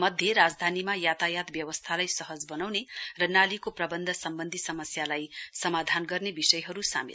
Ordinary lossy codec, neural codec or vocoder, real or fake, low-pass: none; none; real; none